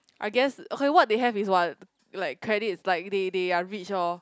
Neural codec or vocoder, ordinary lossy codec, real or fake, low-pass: none; none; real; none